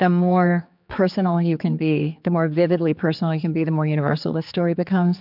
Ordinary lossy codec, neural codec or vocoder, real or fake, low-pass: MP3, 48 kbps; codec, 16 kHz, 4 kbps, X-Codec, HuBERT features, trained on general audio; fake; 5.4 kHz